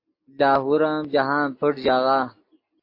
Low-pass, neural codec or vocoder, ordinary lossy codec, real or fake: 5.4 kHz; none; MP3, 32 kbps; real